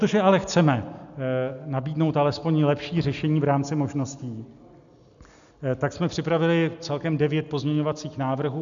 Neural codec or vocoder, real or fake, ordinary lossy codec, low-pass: none; real; MP3, 96 kbps; 7.2 kHz